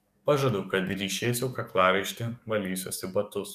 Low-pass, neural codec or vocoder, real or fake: 14.4 kHz; codec, 44.1 kHz, 7.8 kbps, DAC; fake